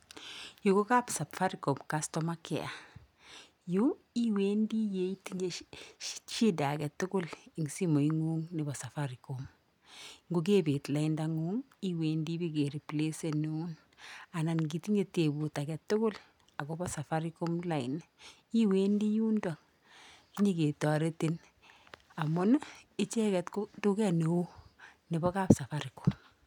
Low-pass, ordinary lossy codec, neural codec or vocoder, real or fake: 19.8 kHz; none; none; real